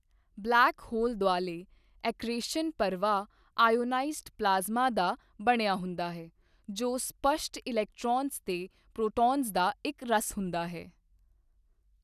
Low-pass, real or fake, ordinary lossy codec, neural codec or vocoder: 14.4 kHz; real; none; none